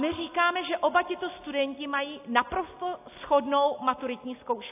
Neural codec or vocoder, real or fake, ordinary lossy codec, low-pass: none; real; AAC, 24 kbps; 3.6 kHz